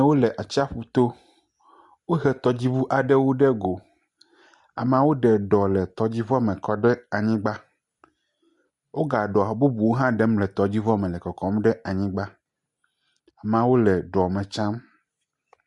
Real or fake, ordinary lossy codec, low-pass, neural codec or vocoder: real; Opus, 64 kbps; 10.8 kHz; none